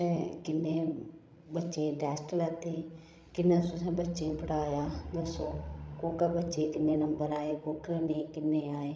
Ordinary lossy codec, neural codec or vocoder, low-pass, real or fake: none; codec, 16 kHz, 16 kbps, FreqCodec, larger model; none; fake